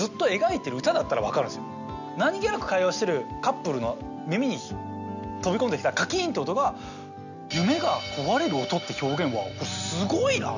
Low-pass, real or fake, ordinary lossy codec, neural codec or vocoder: 7.2 kHz; real; none; none